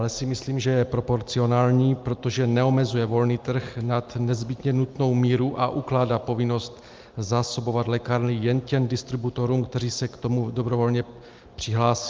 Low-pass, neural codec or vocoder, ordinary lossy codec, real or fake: 7.2 kHz; none; Opus, 24 kbps; real